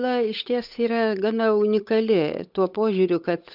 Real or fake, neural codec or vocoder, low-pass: fake; codec, 16 kHz, 8 kbps, FunCodec, trained on Chinese and English, 25 frames a second; 5.4 kHz